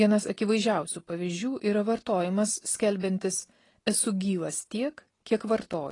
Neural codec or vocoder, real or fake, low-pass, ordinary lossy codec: none; real; 10.8 kHz; AAC, 32 kbps